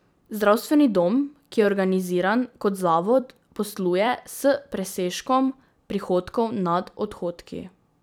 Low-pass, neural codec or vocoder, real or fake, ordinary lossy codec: none; vocoder, 44.1 kHz, 128 mel bands every 256 samples, BigVGAN v2; fake; none